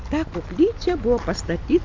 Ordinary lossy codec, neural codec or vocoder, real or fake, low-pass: MP3, 64 kbps; none; real; 7.2 kHz